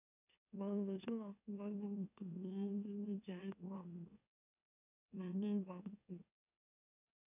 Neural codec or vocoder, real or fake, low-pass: autoencoder, 44.1 kHz, a latent of 192 numbers a frame, MeloTTS; fake; 3.6 kHz